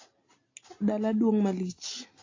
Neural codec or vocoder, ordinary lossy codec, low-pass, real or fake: none; AAC, 32 kbps; 7.2 kHz; real